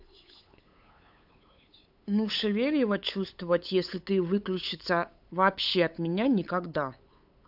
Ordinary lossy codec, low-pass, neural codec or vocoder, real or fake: AAC, 48 kbps; 5.4 kHz; codec, 16 kHz, 8 kbps, FunCodec, trained on LibriTTS, 25 frames a second; fake